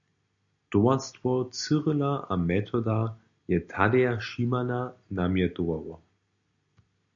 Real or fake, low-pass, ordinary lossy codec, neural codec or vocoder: real; 7.2 kHz; AAC, 64 kbps; none